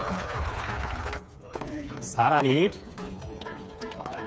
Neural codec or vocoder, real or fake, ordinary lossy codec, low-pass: codec, 16 kHz, 4 kbps, FreqCodec, smaller model; fake; none; none